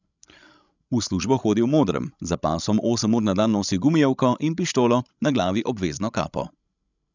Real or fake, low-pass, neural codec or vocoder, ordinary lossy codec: fake; 7.2 kHz; codec, 16 kHz, 16 kbps, FreqCodec, larger model; none